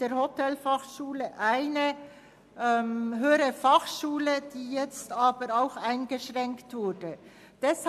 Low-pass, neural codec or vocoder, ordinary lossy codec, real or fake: 14.4 kHz; none; AAC, 96 kbps; real